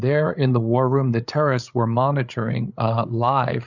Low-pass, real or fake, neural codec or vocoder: 7.2 kHz; fake; codec, 16 kHz, 8 kbps, FreqCodec, larger model